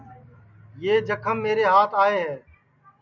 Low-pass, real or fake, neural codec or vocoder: 7.2 kHz; real; none